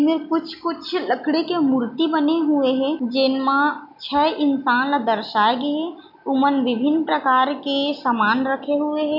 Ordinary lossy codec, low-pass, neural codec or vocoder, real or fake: none; 5.4 kHz; none; real